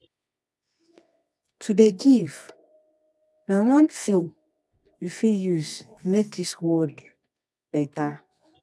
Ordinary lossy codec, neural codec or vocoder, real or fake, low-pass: none; codec, 24 kHz, 0.9 kbps, WavTokenizer, medium music audio release; fake; none